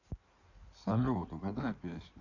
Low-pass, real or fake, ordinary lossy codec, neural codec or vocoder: 7.2 kHz; fake; none; codec, 16 kHz in and 24 kHz out, 2.2 kbps, FireRedTTS-2 codec